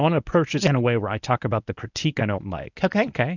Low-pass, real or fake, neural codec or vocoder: 7.2 kHz; fake; codec, 24 kHz, 0.9 kbps, WavTokenizer, medium speech release version 1